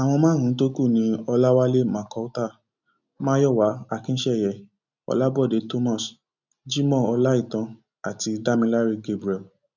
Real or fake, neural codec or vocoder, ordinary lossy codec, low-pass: real; none; none; 7.2 kHz